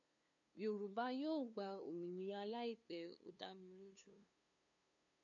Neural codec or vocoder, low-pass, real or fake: codec, 16 kHz, 2 kbps, FunCodec, trained on LibriTTS, 25 frames a second; 7.2 kHz; fake